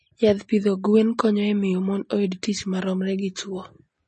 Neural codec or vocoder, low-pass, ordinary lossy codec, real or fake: none; 9.9 kHz; MP3, 32 kbps; real